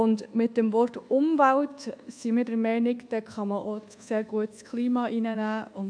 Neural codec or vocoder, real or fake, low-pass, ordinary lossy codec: codec, 24 kHz, 1.2 kbps, DualCodec; fake; 9.9 kHz; AAC, 64 kbps